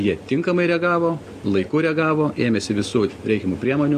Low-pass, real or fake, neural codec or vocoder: 14.4 kHz; real; none